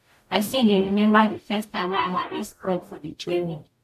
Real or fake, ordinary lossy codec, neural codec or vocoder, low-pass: fake; MP3, 64 kbps; codec, 44.1 kHz, 0.9 kbps, DAC; 14.4 kHz